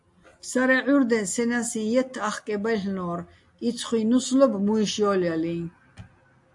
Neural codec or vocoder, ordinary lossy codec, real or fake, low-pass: none; AAC, 64 kbps; real; 10.8 kHz